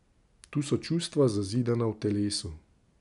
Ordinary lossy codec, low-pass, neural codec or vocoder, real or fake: none; 10.8 kHz; none; real